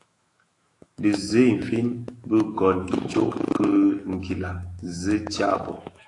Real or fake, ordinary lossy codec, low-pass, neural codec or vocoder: fake; AAC, 48 kbps; 10.8 kHz; autoencoder, 48 kHz, 128 numbers a frame, DAC-VAE, trained on Japanese speech